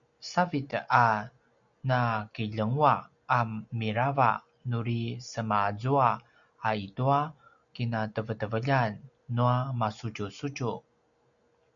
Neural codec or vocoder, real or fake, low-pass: none; real; 7.2 kHz